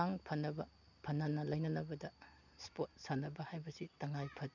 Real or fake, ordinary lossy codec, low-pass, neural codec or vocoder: real; none; 7.2 kHz; none